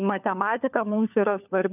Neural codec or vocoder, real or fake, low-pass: codec, 16 kHz, 4 kbps, FunCodec, trained on LibriTTS, 50 frames a second; fake; 3.6 kHz